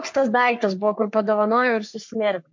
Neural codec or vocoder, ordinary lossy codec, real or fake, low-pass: codec, 44.1 kHz, 3.4 kbps, Pupu-Codec; MP3, 48 kbps; fake; 7.2 kHz